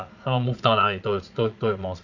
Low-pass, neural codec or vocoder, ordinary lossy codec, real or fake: 7.2 kHz; vocoder, 22.05 kHz, 80 mel bands, Vocos; none; fake